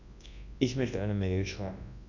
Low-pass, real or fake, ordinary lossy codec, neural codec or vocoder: 7.2 kHz; fake; none; codec, 24 kHz, 0.9 kbps, WavTokenizer, large speech release